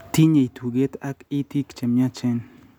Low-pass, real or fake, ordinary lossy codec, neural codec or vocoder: 19.8 kHz; real; none; none